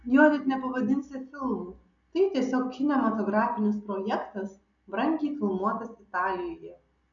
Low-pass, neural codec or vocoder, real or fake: 7.2 kHz; none; real